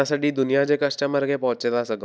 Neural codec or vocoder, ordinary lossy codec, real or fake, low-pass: none; none; real; none